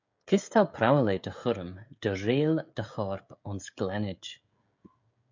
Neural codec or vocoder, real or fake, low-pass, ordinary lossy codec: codec, 16 kHz, 16 kbps, FreqCodec, smaller model; fake; 7.2 kHz; MP3, 64 kbps